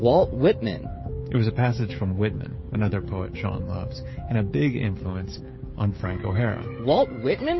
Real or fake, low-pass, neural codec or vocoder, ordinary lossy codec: fake; 7.2 kHz; codec, 16 kHz, 16 kbps, FreqCodec, smaller model; MP3, 24 kbps